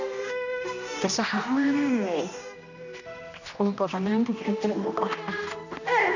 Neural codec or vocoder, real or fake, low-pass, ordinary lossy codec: codec, 16 kHz, 1 kbps, X-Codec, HuBERT features, trained on general audio; fake; 7.2 kHz; none